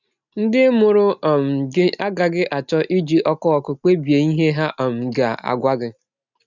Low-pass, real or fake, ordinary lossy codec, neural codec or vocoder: 7.2 kHz; real; none; none